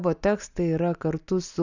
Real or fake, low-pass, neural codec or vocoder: real; 7.2 kHz; none